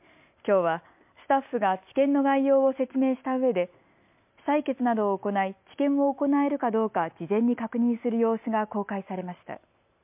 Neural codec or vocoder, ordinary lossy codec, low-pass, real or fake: none; MP3, 32 kbps; 3.6 kHz; real